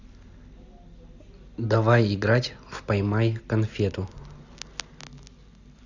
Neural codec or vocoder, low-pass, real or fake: none; 7.2 kHz; real